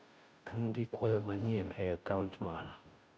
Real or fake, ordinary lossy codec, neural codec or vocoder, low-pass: fake; none; codec, 16 kHz, 0.5 kbps, FunCodec, trained on Chinese and English, 25 frames a second; none